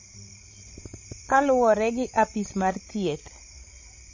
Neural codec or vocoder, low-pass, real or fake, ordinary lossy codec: codec, 16 kHz, 16 kbps, FreqCodec, larger model; 7.2 kHz; fake; MP3, 32 kbps